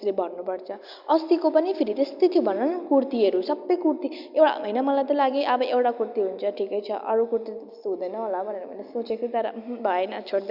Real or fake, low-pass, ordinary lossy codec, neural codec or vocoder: real; 5.4 kHz; Opus, 64 kbps; none